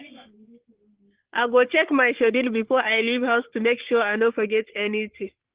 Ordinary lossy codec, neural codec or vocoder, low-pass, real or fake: Opus, 16 kbps; codec, 44.1 kHz, 3.4 kbps, Pupu-Codec; 3.6 kHz; fake